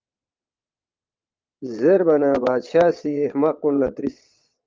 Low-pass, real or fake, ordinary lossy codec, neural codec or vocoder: 7.2 kHz; fake; Opus, 32 kbps; vocoder, 22.05 kHz, 80 mel bands, Vocos